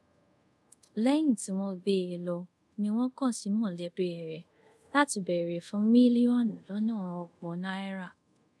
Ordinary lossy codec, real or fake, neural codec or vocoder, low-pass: none; fake; codec, 24 kHz, 0.5 kbps, DualCodec; none